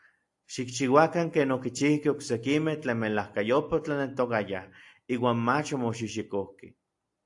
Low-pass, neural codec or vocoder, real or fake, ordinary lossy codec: 10.8 kHz; none; real; AAC, 64 kbps